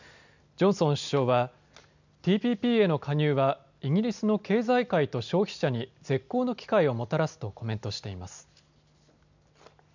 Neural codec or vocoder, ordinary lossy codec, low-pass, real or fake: none; none; 7.2 kHz; real